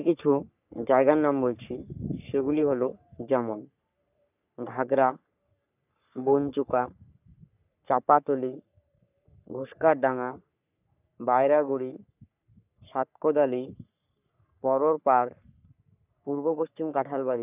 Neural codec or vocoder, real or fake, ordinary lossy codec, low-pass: codec, 44.1 kHz, 3.4 kbps, Pupu-Codec; fake; none; 3.6 kHz